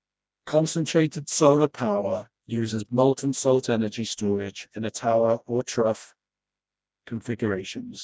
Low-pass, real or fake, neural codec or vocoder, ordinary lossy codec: none; fake; codec, 16 kHz, 1 kbps, FreqCodec, smaller model; none